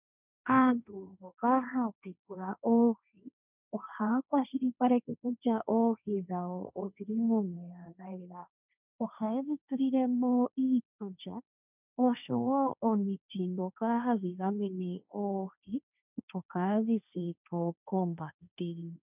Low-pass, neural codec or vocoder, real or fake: 3.6 kHz; codec, 16 kHz, 1.1 kbps, Voila-Tokenizer; fake